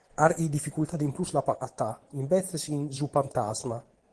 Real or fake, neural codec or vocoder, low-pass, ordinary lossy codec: fake; vocoder, 24 kHz, 100 mel bands, Vocos; 10.8 kHz; Opus, 16 kbps